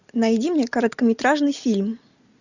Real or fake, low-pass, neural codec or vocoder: real; 7.2 kHz; none